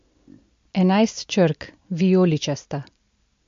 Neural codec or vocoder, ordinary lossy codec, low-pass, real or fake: none; MP3, 48 kbps; 7.2 kHz; real